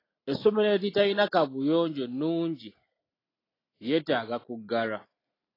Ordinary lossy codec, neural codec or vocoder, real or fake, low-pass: AAC, 24 kbps; none; real; 5.4 kHz